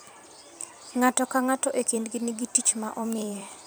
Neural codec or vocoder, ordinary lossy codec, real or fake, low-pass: vocoder, 44.1 kHz, 128 mel bands every 256 samples, BigVGAN v2; none; fake; none